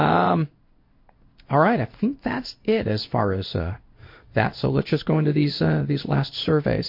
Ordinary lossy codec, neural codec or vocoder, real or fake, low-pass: MP3, 32 kbps; codec, 16 kHz in and 24 kHz out, 1 kbps, XY-Tokenizer; fake; 5.4 kHz